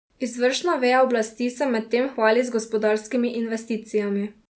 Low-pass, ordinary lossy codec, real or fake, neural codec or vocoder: none; none; real; none